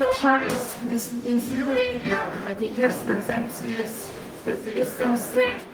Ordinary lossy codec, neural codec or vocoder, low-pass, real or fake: Opus, 32 kbps; codec, 44.1 kHz, 0.9 kbps, DAC; 19.8 kHz; fake